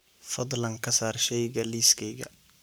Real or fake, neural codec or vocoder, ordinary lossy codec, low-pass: fake; codec, 44.1 kHz, 7.8 kbps, Pupu-Codec; none; none